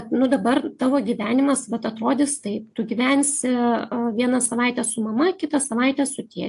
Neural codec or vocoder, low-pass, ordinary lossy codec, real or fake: none; 10.8 kHz; AAC, 64 kbps; real